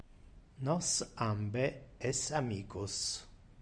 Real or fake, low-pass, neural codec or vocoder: real; 9.9 kHz; none